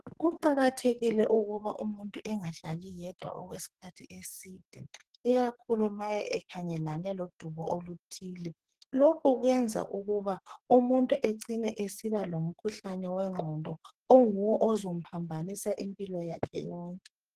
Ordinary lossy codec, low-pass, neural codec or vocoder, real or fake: Opus, 16 kbps; 14.4 kHz; codec, 44.1 kHz, 2.6 kbps, SNAC; fake